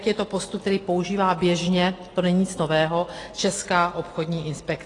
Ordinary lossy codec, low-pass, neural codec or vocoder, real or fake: AAC, 32 kbps; 10.8 kHz; none; real